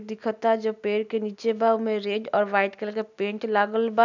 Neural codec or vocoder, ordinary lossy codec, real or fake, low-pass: none; none; real; 7.2 kHz